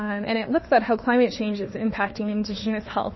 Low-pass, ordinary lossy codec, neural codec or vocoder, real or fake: 7.2 kHz; MP3, 24 kbps; autoencoder, 48 kHz, 32 numbers a frame, DAC-VAE, trained on Japanese speech; fake